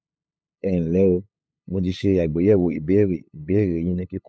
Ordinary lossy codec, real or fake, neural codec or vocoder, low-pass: none; fake; codec, 16 kHz, 8 kbps, FunCodec, trained on LibriTTS, 25 frames a second; none